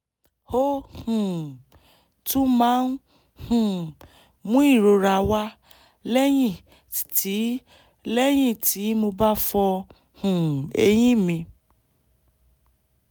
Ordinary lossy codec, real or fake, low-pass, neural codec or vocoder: none; real; none; none